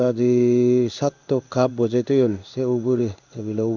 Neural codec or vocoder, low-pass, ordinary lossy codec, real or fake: codec, 16 kHz in and 24 kHz out, 1 kbps, XY-Tokenizer; 7.2 kHz; none; fake